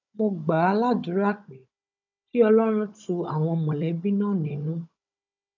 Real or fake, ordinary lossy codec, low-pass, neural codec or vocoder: fake; none; 7.2 kHz; codec, 16 kHz, 16 kbps, FunCodec, trained on Chinese and English, 50 frames a second